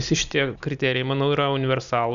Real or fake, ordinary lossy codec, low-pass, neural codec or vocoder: real; MP3, 96 kbps; 7.2 kHz; none